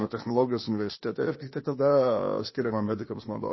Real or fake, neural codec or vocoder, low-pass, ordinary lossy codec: fake; codec, 16 kHz, 0.8 kbps, ZipCodec; 7.2 kHz; MP3, 24 kbps